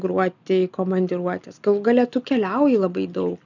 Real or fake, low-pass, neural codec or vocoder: real; 7.2 kHz; none